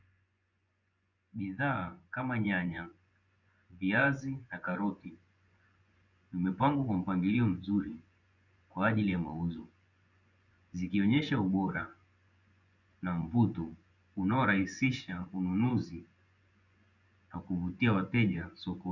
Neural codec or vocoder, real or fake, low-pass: vocoder, 24 kHz, 100 mel bands, Vocos; fake; 7.2 kHz